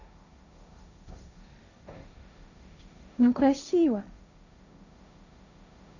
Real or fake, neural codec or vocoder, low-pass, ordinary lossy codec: fake; codec, 16 kHz, 1.1 kbps, Voila-Tokenizer; 7.2 kHz; none